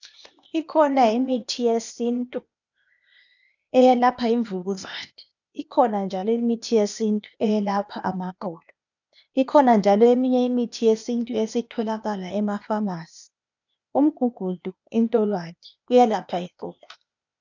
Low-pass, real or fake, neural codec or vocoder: 7.2 kHz; fake; codec, 16 kHz, 0.8 kbps, ZipCodec